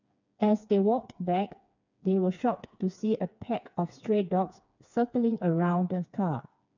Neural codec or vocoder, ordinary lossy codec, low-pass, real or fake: codec, 16 kHz, 4 kbps, FreqCodec, smaller model; none; 7.2 kHz; fake